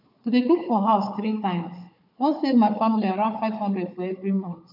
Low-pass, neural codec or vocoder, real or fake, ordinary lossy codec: 5.4 kHz; codec, 16 kHz, 4 kbps, FunCodec, trained on Chinese and English, 50 frames a second; fake; MP3, 48 kbps